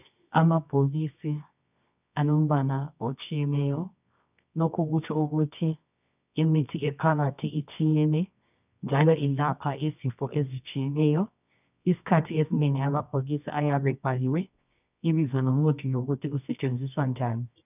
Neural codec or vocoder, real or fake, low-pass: codec, 24 kHz, 0.9 kbps, WavTokenizer, medium music audio release; fake; 3.6 kHz